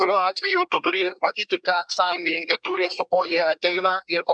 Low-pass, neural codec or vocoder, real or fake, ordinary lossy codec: 9.9 kHz; codec, 24 kHz, 1 kbps, SNAC; fake; MP3, 96 kbps